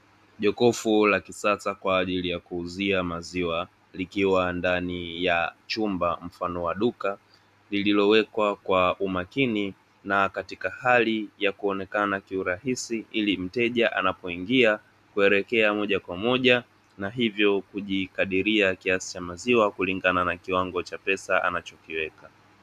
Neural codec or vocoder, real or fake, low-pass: none; real; 14.4 kHz